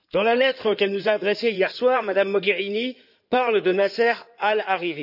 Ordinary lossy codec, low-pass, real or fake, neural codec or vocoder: none; 5.4 kHz; fake; codec, 16 kHz in and 24 kHz out, 2.2 kbps, FireRedTTS-2 codec